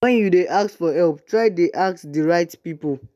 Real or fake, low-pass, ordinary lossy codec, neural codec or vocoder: real; 14.4 kHz; none; none